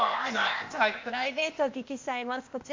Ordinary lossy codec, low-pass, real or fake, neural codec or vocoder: MP3, 48 kbps; 7.2 kHz; fake; codec, 16 kHz, 0.8 kbps, ZipCodec